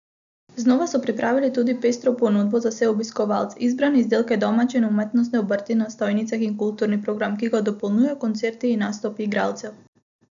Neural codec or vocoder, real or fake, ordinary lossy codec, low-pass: none; real; none; 7.2 kHz